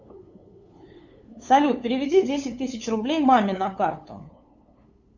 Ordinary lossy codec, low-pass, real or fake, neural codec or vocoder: Opus, 64 kbps; 7.2 kHz; fake; codec, 16 kHz, 8 kbps, FunCodec, trained on LibriTTS, 25 frames a second